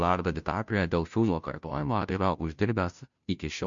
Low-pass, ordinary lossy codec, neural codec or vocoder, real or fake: 7.2 kHz; MP3, 64 kbps; codec, 16 kHz, 0.5 kbps, FunCodec, trained on Chinese and English, 25 frames a second; fake